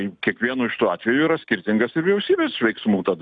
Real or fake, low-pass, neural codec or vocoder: real; 9.9 kHz; none